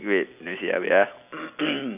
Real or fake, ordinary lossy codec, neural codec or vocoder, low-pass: real; none; none; 3.6 kHz